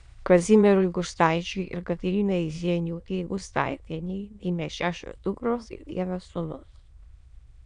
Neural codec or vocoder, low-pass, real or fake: autoencoder, 22.05 kHz, a latent of 192 numbers a frame, VITS, trained on many speakers; 9.9 kHz; fake